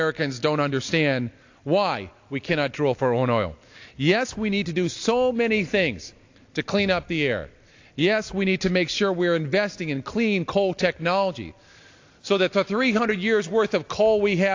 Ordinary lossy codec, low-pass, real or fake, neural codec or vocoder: AAC, 48 kbps; 7.2 kHz; real; none